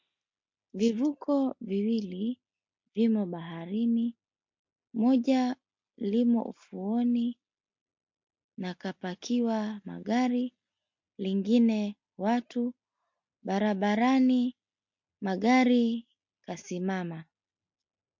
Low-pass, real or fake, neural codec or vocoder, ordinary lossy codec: 7.2 kHz; real; none; MP3, 48 kbps